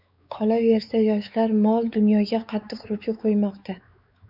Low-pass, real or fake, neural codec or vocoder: 5.4 kHz; fake; codec, 16 kHz, 4 kbps, X-Codec, WavLM features, trained on Multilingual LibriSpeech